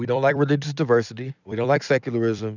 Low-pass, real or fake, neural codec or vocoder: 7.2 kHz; fake; vocoder, 44.1 kHz, 128 mel bands every 256 samples, BigVGAN v2